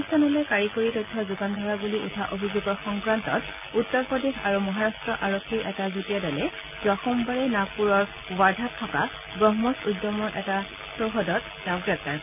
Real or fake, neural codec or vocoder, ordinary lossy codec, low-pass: real; none; none; 3.6 kHz